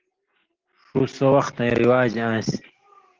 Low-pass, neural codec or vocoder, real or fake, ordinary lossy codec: 7.2 kHz; none; real; Opus, 16 kbps